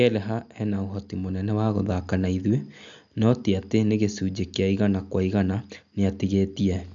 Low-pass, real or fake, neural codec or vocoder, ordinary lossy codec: 7.2 kHz; real; none; MP3, 64 kbps